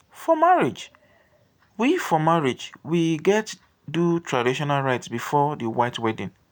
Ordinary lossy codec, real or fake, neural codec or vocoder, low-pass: none; real; none; none